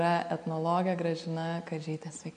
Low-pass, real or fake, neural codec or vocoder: 9.9 kHz; real; none